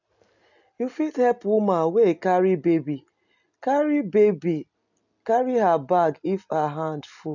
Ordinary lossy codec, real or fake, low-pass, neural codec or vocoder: none; real; 7.2 kHz; none